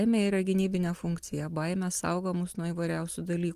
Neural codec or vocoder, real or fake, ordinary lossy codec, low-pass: none; real; Opus, 24 kbps; 14.4 kHz